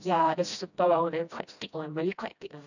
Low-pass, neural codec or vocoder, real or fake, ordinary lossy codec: 7.2 kHz; codec, 16 kHz, 1 kbps, FreqCodec, smaller model; fake; none